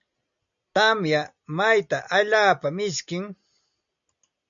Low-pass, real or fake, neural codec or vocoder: 7.2 kHz; real; none